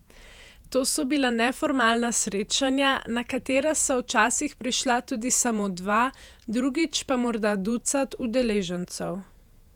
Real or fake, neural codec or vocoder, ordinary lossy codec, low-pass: fake; vocoder, 48 kHz, 128 mel bands, Vocos; none; 19.8 kHz